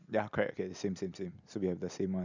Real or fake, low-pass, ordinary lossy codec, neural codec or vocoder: real; 7.2 kHz; none; none